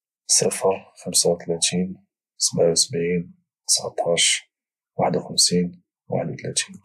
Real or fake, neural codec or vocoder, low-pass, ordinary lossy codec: real; none; 14.4 kHz; AAC, 96 kbps